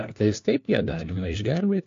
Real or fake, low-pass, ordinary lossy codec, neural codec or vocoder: fake; 7.2 kHz; AAC, 48 kbps; codec, 16 kHz, 1 kbps, FunCodec, trained on LibriTTS, 50 frames a second